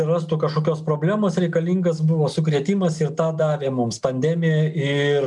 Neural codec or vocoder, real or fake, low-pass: none; real; 10.8 kHz